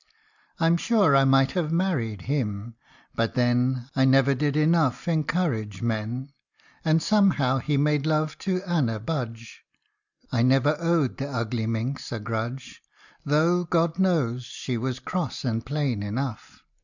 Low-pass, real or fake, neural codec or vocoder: 7.2 kHz; real; none